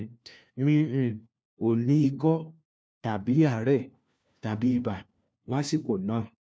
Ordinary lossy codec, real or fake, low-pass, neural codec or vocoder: none; fake; none; codec, 16 kHz, 1 kbps, FunCodec, trained on LibriTTS, 50 frames a second